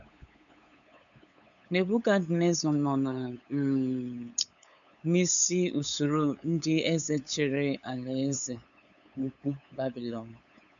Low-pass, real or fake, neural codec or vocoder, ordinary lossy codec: 7.2 kHz; fake; codec, 16 kHz, 8 kbps, FunCodec, trained on LibriTTS, 25 frames a second; none